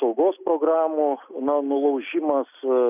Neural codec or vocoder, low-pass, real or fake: none; 3.6 kHz; real